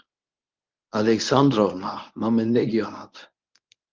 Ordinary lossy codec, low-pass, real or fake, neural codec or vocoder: Opus, 32 kbps; 7.2 kHz; fake; codec, 24 kHz, 0.9 kbps, WavTokenizer, medium speech release version 1